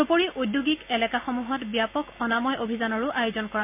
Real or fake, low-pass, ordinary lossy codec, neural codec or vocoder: real; 3.6 kHz; MP3, 32 kbps; none